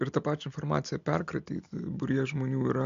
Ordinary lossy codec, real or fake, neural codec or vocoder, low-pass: MP3, 64 kbps; real; none; 7.2 kHz